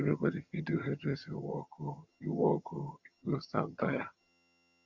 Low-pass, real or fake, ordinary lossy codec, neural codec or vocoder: 7.2 kHz; fake; Opus, 64 kbps; vocoder, 22.05 kHz, 80 mel bands, HiFi-GAN